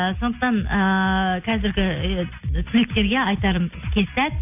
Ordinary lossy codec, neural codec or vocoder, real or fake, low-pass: AAC, 32 kbps; none; real; 3.6 kHz